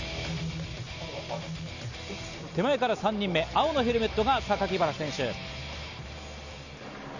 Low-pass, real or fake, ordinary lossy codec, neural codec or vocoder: 7.2 kHz; real; none; none